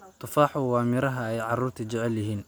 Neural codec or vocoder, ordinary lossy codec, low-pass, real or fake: none; none; none; real